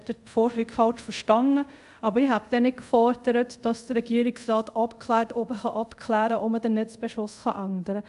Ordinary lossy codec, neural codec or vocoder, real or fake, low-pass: AAC, 96 kbps; codec, 24 kHz, 0.5 kbps, DualCodec; fake; 10.8 kHz